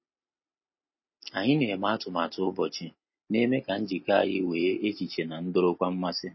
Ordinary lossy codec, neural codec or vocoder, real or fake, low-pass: MP3, 24 kbps; none; real; 7.2 kHz